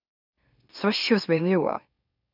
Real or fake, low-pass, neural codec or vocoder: fake; 5.4 kHz; autoencoder, 44.1 kHz, a latent of 192 numbers a frame, MeloTTS